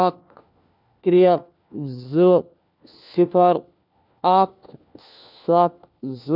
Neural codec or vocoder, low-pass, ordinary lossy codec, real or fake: codec, 16 kHz, 1 kbps, FunCodec, trained on LibriTTS, 50 frames a second; 5.4 kHz; none; fake